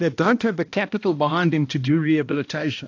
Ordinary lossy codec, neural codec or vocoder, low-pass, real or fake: AAC, 48 kbps; codec, 16 kHz, 1 kbps, X-Codec, HuBERT features, trained on balanced general audio; 7.2 kHz; fake